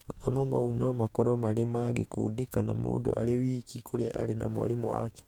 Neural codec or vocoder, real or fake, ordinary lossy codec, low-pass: codec, 44.1 kHz, 2.6 kbps, DAC; fake; MP3, 96 kbps; 19.8 kHz